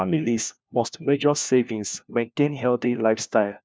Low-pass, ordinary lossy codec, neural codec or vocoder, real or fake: none; none; codec, 16 kHz, 1 kbps, FunCodec, trained on LibriTTS, 50 frames a second; fake